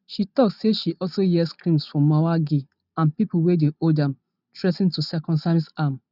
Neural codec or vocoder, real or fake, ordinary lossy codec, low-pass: none; real; none; 5.4 kHz